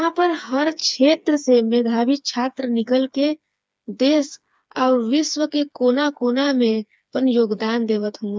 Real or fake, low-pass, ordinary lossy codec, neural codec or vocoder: fake; none; none; codec, 16 kHz, 4 kbps, FreqCodec, smaller model